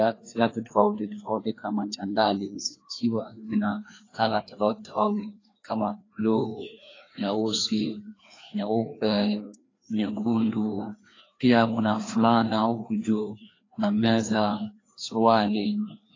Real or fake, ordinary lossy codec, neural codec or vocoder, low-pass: fake; AAC, 32 kbps; codec, 16 kHz, 2 kbps, FreqCodec, larger model; 7.2 kHz